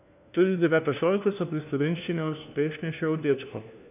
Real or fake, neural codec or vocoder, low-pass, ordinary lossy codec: fake; codec, 16 kHz, 1 kbps, FunCodec, trained on LibriTTS, 50 frames a second; 3.6 kHz; none